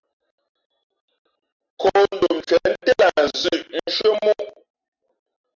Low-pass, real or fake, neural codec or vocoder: 7.2 kHz; real; none